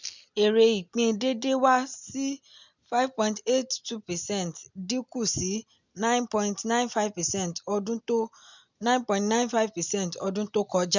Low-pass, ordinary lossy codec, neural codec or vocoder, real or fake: 7.2 kHz; none; none; real